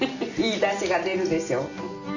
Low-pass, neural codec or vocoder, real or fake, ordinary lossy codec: 7.2 kHz; none; real; none